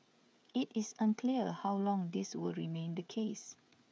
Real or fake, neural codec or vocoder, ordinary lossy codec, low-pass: fake; codec, 16 kHz, 16 kbps, FreqCodec, smaller model; none; none